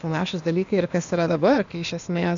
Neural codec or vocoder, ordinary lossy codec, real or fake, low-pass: codec, 16 kHz, 0.8 kbps, ZipCodec; MP3, 64 kbps; fake; 7.2 kHz